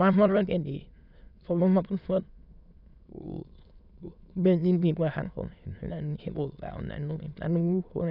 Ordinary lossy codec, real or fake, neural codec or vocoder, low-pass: none; fake; autoencoder, 22.05 kHz, a latent of 192 numbers a frame, VITS, trained on many speakers; 5.4 kHz